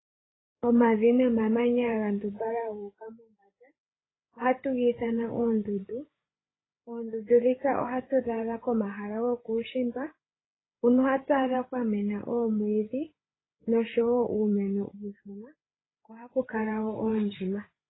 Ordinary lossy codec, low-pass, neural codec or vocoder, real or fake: AAC, 16 kbps; 7.2 kHz; codec, 16 kHz, 8 kbps, FreqCodec, larger model; fake